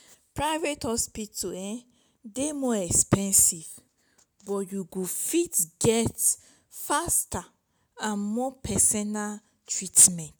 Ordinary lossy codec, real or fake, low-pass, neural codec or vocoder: none; real; none; none